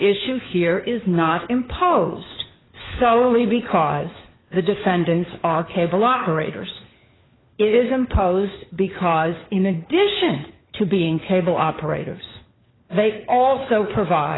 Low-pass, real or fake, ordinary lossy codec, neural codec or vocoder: 7.2 kHz; fake; AAC, 16 kbps; codec, 16 kHz, 4 kbps, FunCodec, trained on LibriTTS, 50 frames a second